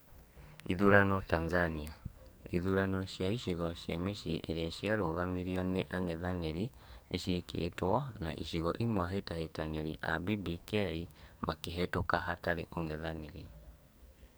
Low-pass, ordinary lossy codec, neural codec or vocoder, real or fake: none; none; codec, 44.1 kHz, 2.6 kbps, SNAC; fake